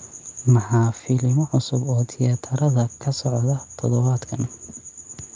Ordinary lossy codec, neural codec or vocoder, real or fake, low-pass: Opus, 24 kbps; none; real; 7.2 kHz